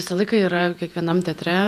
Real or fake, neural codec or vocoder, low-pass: fake; vocoder, 48 kHz, 128 mel bands, Vocos; 14.4 kHz